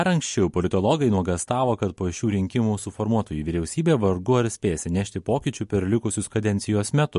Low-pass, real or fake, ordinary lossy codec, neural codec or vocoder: 14.4 kHz; real; MP3, 48 kbps; none